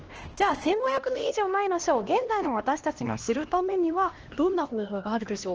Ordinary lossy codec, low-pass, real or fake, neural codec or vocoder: Opus, 16 kbps; 7.2 kHz; fake; codec, 16 kHz, 1 kbps, X-Codec, HuBERT features, trained on LibriSpeech